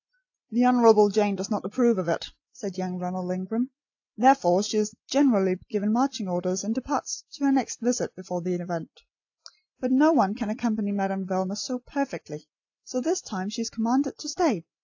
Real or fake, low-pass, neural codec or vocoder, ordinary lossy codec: real; 7.2 kHz; none; AAC, 48 kbps